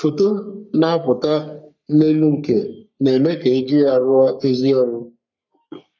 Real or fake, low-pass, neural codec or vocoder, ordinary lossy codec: fake; 7.2 kHz; codec, 44.1 kHz, 3.4 kbps, Pupu-Codec; none